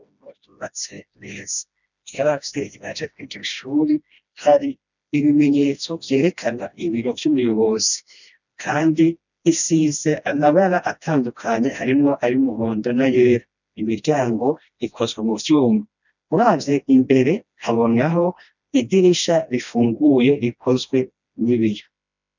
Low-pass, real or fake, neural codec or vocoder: 7.2 kHz; fake; codec, 16 kHz, 1 kbps, FreqCodec, smaller model